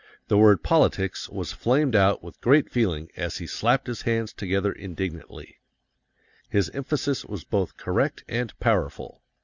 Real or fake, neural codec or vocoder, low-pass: real; none; 7.2 kHz